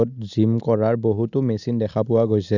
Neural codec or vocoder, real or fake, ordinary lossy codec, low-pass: none; real; none; 7.2 kHz